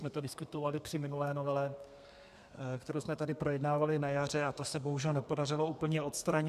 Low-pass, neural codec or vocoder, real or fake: 14.4 kHz; codec, 32 kHz, 1.9 kbps, SNAC; fake